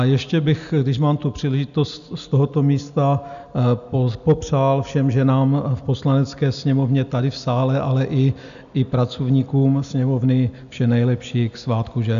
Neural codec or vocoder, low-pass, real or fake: none; 7.2 kHz; real